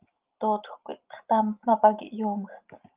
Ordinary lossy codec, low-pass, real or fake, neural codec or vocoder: Opus, 32 kbps; 3.6 kHz; real; none